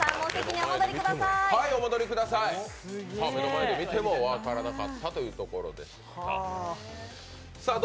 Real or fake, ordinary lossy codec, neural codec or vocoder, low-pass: real; none; none; none